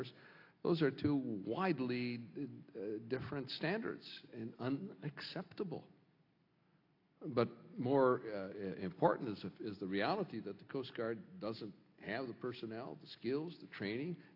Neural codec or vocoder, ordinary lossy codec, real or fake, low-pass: none; AAC, 32 kbps; real; 5.4 kHz